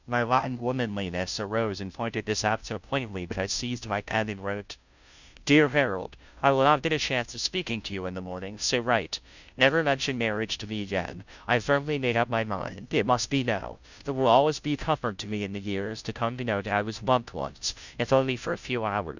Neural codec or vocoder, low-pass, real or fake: codec, 16 kHz, 0.5 kbps, FunCodec, trained on Chinese and English, 25 frames a second; 7.2 kHz; fake